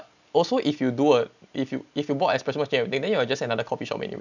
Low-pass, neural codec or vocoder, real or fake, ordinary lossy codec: 7.2 kHz; none; real; none